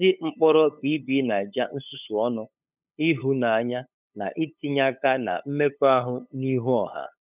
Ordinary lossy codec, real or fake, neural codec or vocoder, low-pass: none; fake; codec, 16 kHz, 8 kbps, FunCodec, trained on LibriTTS, 25 frames a second; 3.6 kHz